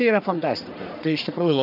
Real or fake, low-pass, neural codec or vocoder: fake; 5.4 kHz; codec, 44.1 kHz, 1.7 kbps, Pupu-Codec